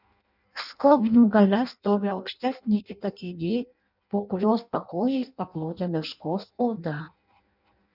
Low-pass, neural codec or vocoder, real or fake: 5.4 kHz; codec, 16 kHz in and 24 kHz out, 0.6 kbps, FireRedTTS-2 codec; fake